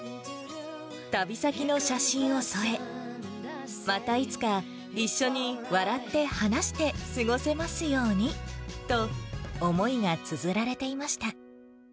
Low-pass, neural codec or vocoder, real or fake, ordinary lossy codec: none; none; real; none